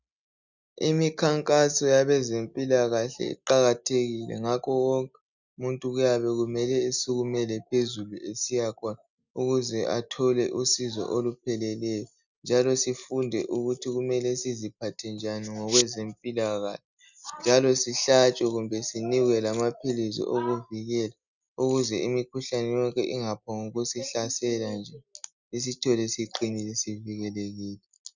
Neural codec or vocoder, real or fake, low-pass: none; real; 7.2 kHz